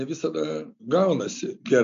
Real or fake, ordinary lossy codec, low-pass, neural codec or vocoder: real; MP3, 48 kbps; 7.2 kHz; none